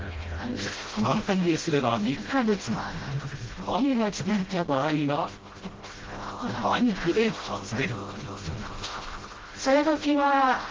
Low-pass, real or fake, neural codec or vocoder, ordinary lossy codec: 7.2 kHz; fake; codec, 16 kHz, 0.5 kbps, FreqCodec, smaller model; Opus, 16 kbps